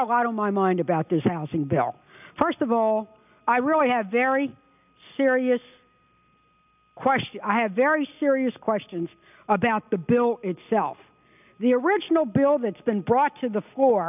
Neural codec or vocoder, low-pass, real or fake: none; 3.6 kHz; real